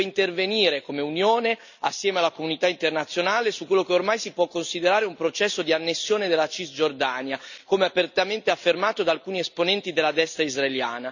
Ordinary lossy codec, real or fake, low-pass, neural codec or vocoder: none; real; 7.2 kHz; none